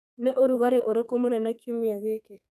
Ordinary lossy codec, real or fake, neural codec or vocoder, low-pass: none; fake; codec, 32 kHz, 1.9 kbps, SNAC; 14.4 kHz